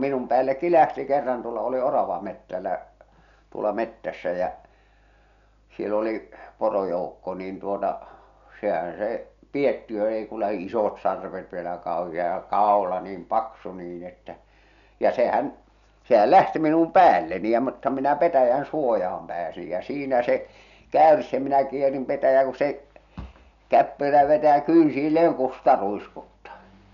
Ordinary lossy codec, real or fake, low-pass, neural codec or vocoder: none; real; 7.2 kHz; none